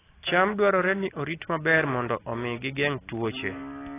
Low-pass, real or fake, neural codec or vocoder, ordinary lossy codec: 3.6 kHz; real; none; AAC, 16 kbps